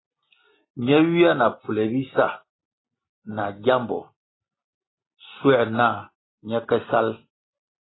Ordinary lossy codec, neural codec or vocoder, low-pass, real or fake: AAC, 16 kbps; none; 7.2 kHz; real